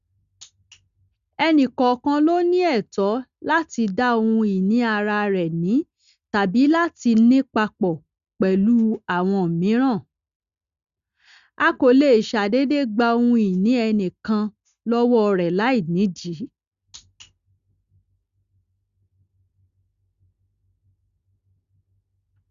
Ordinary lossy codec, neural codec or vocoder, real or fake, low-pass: Opus, 64 kbps; none; real; 7.2 kHz